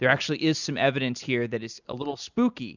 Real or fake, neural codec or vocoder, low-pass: real; none; 7.2 kHz